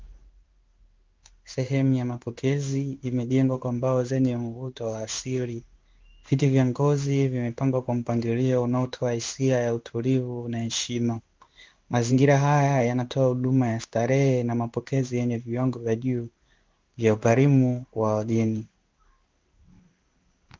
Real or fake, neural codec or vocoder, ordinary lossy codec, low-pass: fake; codec, 16 kHz in and 24 kHz out, 1 kbps, XY-Tokenizer; Opus, 32 kbps; 7.2 kHz